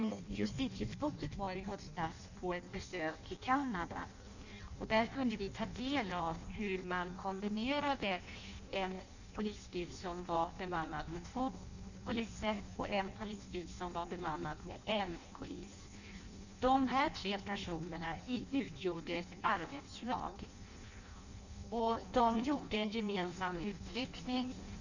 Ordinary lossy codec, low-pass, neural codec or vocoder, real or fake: none; 7.2 kHz; codec, 16 kHz in and 24 kHz out, 0.6 kbps, FireRedTTS-2 codec; fake